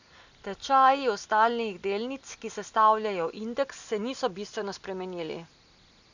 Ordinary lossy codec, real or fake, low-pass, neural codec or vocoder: none; real; 7.2 kHz; none